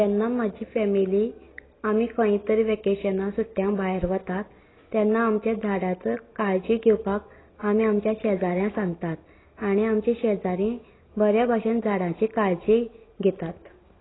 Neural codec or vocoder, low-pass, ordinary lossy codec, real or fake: none; 7.2 kHz; AAC, 16 kbps; real